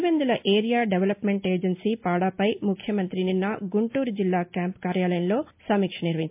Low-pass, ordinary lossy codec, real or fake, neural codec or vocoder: 3.6 kHz; MP3, 32 kbps; real; none